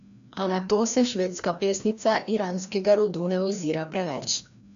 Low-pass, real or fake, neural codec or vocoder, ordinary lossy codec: 7.2 kHz; fake; codec, 16 kHz, 1 kbps, FreqCodec, larger model; none